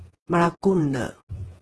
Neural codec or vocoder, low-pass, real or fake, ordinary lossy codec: vocoder, 48 kHz, 128 mel bands, Vocos; 10.8 kHz; fake; Opus, 16 kbps